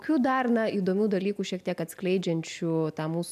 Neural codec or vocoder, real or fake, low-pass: none; real; 14.4 kHz